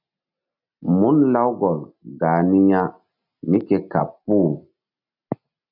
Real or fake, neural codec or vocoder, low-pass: real; none; 5.4 kHz